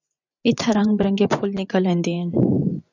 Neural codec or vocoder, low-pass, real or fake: vocoder, 24 kHz, 100 mel bands, Vocos; 7.2 kHz; fake